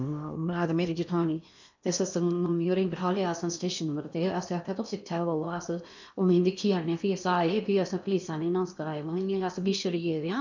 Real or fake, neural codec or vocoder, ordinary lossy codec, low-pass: fake; codec, 16 kHz in and 24 kHz out, 0.8 kbps, FocalCodec, streaming, 65536 codes; none; 7.2 kHz